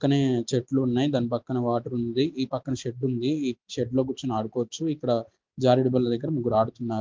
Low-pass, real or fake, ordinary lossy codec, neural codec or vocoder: 7.2 kHz; real; Opus, 32 kbps; none